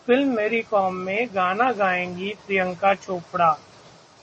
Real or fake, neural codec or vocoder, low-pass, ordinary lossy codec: real; none; 9.9 kHz; MP3, 32 kbps